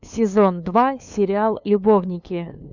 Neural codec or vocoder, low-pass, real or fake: codec, 16 kHz, 2 kbps, FunCodec, trained on LibriTTS, 25 frames a second; 7.2 kHz; fake